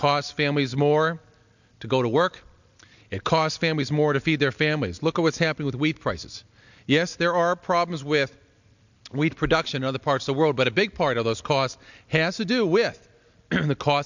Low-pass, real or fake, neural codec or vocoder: 7.2 kHz; real; none